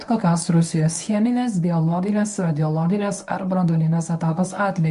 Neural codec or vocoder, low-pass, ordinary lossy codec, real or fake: codec, 24 kHz, 0.9 kbps, WavTokenizer, medium speech release version 1; 10.8 kHz; MP3, 64 kbps; fake